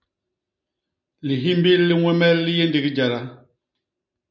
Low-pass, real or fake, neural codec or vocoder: 7.2 kHz; real; none